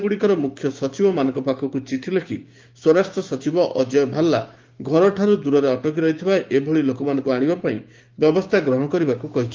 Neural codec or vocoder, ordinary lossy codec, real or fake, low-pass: codec, 16 kHz, 6 kbps, DAC; Opus, 32 kbps; fake; 7.2 kHz